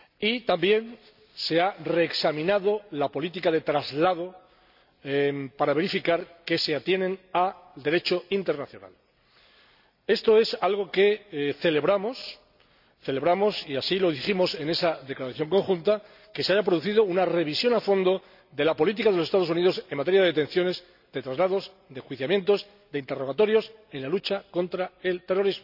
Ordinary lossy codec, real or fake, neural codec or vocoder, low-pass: none; real; none; 5.4 kHz